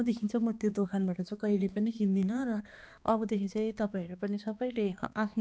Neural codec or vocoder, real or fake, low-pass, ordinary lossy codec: codec, 16 kHz, 2 kbps, X-Codec, HuBERT features, trained on balanced general audio; fake; none; none